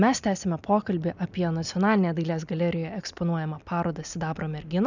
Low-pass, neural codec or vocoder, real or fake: 7.2 kHz; none; real